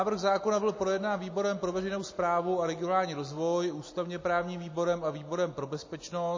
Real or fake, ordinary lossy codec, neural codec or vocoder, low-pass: real; MP3, 32 kbps; none; 7.2 kHz